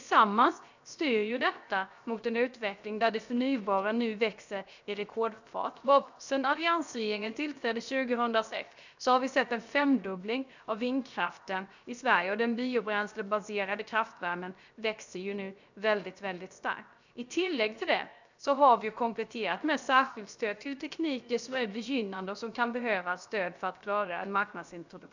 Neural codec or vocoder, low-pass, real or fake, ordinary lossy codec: codec, 16 kHz, 0.7 kbps, FocalCodec; 7.2 kHz; fake; none